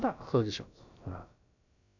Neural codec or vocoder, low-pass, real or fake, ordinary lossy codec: codec, 16 kHz, about 1 kbps, DyCAST, with the encoder's durations; 7.2 kHz; fake; none